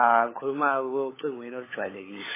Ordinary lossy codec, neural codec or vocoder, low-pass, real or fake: MP3, 16 kbps; codec, 16 kHz, 16 kbps, FunCodec, trained on LibriTTS, 50 frames a second; 3.6 kHz; fake